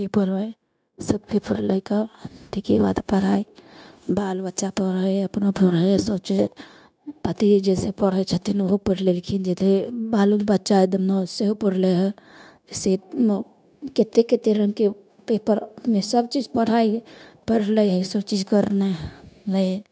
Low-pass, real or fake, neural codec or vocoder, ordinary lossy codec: none; fake; codec, 16 kHz, 0.9 kbps, LongCat-Audio-Codec; none